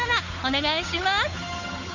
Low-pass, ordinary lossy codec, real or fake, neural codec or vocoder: 7.2 kHz; none; fake; codec, 16 kHz, 8 kbps, FunCodec, trained on Chinese and English, 25 frames a second